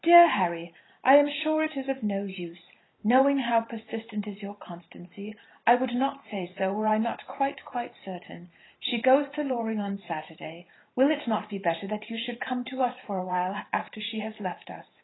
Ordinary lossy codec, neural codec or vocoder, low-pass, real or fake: AAC, 16 kbps; vocoder, 44.1 kHz, 128 mel bands every 512 samples, BigVGAN v2; 7.2 kHz; fake